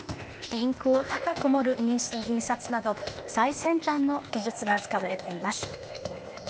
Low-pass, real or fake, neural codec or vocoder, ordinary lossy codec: none; fake; codec, 16 kHz, 0.8 kbps, ZipCodec; none